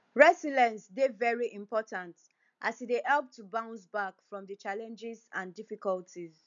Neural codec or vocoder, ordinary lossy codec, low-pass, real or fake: none; none; 7.2 kHz; real